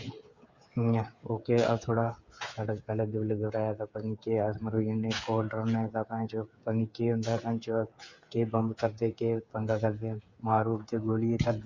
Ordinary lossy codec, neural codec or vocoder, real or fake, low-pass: Opus, 64 kbps; codec, 16 kHz, 8 kbps, FreqCodec, smaller model; fake; 7.2 kHz